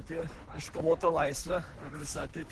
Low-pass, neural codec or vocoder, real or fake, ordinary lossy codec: 10.8 kHz; codec, 24 kHz, 3 kbps, HILCodec; fake; Opus, 16 kbps